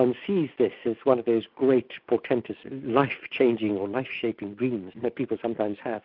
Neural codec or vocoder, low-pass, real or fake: none; 5.4 kHz; real